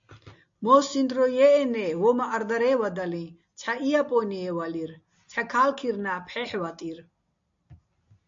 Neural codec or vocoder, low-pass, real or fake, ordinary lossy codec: none; 7.2 kHz; real; AAC, 64 kbps